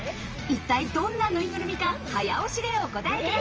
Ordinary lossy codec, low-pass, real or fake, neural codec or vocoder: Opus, 24 kbps; 7.2 kHz; fake; vocoder, 44.1 kHz, 80 mel bands, Vocos